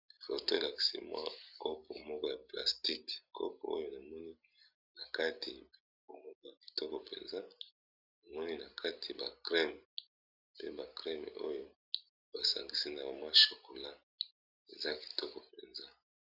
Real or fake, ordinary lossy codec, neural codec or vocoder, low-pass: real; Opus, 64 kbps; none; 5.4 kHz